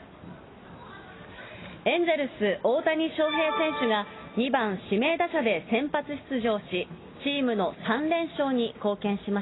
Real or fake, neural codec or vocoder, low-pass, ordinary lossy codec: real; none; 7.2 kHz; AAC, 16 kbps